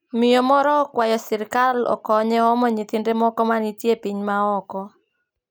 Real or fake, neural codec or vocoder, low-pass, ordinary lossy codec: real; none; none; none